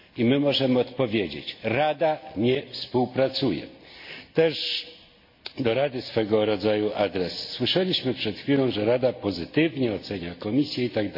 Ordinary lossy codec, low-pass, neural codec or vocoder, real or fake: MP3, 32 kbps; 5.4 kHz; none; real